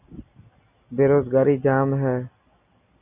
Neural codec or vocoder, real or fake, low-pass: none; real; 3.6 kHz